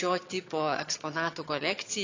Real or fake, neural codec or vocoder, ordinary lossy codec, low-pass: fake; vocoder, 22.05 kHz, 80 mel bands, HiFi-GAN; AAC, 48 kbps; 7.2 kHz